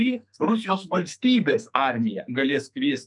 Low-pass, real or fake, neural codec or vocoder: 10.8 kHz; fake; codec, 44.1 kHz, 2.6 kbps, SNAC